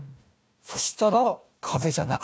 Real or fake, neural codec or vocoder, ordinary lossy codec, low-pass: fake; codec, 16 kHz, 1 kbps, FunCodec, trained on Chinese and English, 50 frames a second; none; none